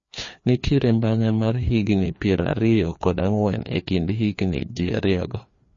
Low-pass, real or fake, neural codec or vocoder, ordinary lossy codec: 7.2 kHz; fake; codec, 16 kHz, 2 kbps, FreqCodec, larger model; MP3, 32 kbps